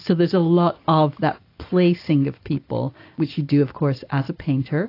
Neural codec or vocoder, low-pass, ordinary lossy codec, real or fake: none; 5.4 kHz; AAC, 32 kbps; real